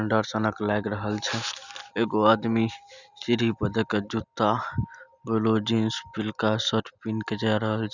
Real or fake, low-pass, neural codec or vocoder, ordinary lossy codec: real; 7.2 kHz; none; none